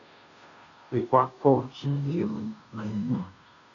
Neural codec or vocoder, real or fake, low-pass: codec, 16 kHz, 0.5 kbps, FunCodec, trained on Chinese and English, 25 frames a second; fake; 7.2 kHz